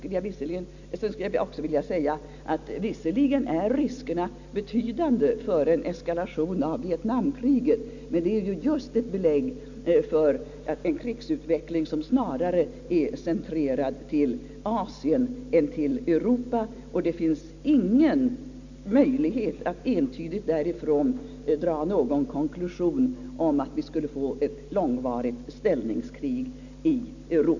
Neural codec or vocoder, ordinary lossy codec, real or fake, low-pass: none; none; real; 7.2 kHz